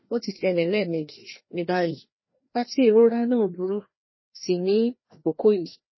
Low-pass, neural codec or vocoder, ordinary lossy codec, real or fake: 7.2 kHz; codec, 16 kHz, 1 kbps, FreqCodec, larger model; MP3, 24 kbps; fake